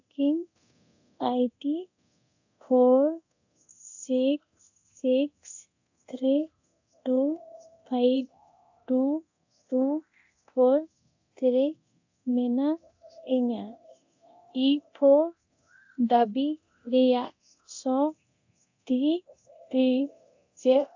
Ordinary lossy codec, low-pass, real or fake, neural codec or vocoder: AAC, 48 kbps; 7.2 kHz; fake; codec, 24 kHz, 0.9 kbps, DualCodec